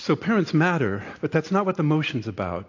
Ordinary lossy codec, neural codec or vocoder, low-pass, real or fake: AAC, 48 kbps; none; 7.2 kHz; real